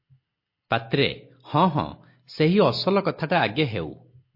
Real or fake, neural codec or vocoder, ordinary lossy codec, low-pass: real; none; MP3, 32 kbps; 5.4 kHz